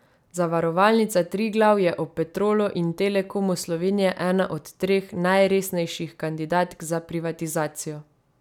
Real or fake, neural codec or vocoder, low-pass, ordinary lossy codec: real; none; 19.8 kHz; none